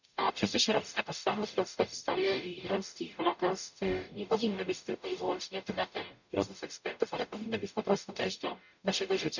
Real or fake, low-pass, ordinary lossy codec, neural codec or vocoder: fake; 7.2 kHz; none; codec, 44.1 kHz, 0.9 kbps, DAC